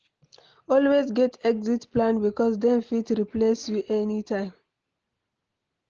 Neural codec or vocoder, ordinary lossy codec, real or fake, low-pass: none; Opus, 16 kbps; real; 7.2 kHz